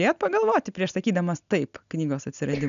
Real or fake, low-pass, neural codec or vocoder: real; 7.2 kHz; none